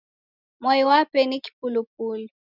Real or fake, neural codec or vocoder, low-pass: real; none; 5.4 kHz